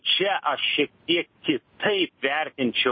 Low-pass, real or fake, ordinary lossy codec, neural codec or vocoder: 7.2 kHz; real; MP3, 24 kbps; none